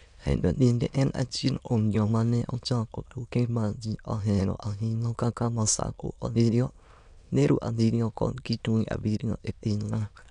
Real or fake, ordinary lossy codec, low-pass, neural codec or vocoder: fake; none; 9.9 kHz; autoencoder, 22.05 kHz, a latent of 192 numbers a frame, VITS, trained on many speakers